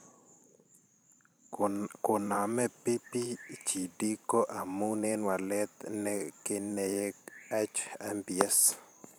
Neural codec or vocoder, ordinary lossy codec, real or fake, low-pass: vocoder, 44.1 kHz, 128 mel bands every 512 samples, BigVGAN v2; none; fake; none